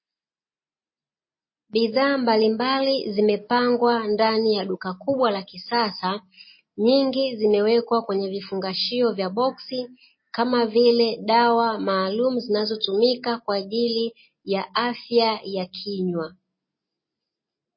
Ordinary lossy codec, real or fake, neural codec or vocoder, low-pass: MP3, 24 kbps; real; none; 7.2 kHz